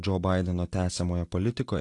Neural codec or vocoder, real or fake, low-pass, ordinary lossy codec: none; real; 10.8 kHz; AAC, 48 kbps